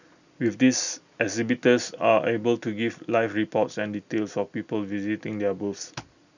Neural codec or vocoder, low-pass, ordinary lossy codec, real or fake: none; 7.2 kHz; none; real